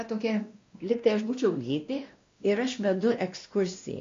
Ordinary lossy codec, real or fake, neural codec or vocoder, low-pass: MP3, 48 kbps; fake; codec, 16 kHz, 1 kbps, X-Codec, WavLM features, trained on Multilingual LibriSpeech; 7.2 kHz